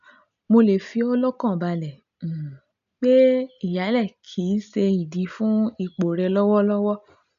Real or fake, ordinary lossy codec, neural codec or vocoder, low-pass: real; none; none; 7.2 kHz